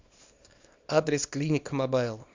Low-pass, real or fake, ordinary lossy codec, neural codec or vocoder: 7.2 kHz; fake; MP3, 64 kbps; codec, 24 kHz, 0.9 kbps, WavTokenizer, small release